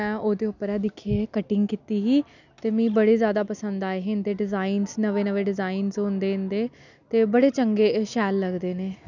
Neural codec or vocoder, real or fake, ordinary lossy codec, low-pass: none; real; none; 7.2 kHz